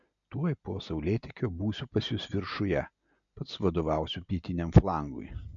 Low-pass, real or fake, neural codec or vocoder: 7.2 kHz; real; none